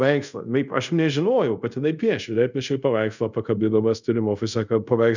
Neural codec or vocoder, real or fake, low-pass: codec, 24 kHz, 0.5 kbps, DualCodec; fake; 7.2 kHz